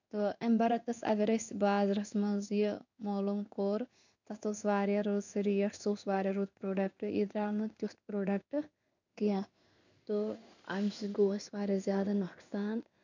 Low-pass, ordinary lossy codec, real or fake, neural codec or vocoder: 7.2 kHz; none; fake; codec, 16 kHz in and 24 kHz out, 1 kbps, XY-Tokenizer